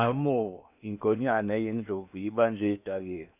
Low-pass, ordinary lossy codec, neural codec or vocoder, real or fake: 3.6 kHz; none; codec, 16 kHz in and 24 kHz out, 0.8 kbps, FocalCodec, streaming, 65536 codes; fake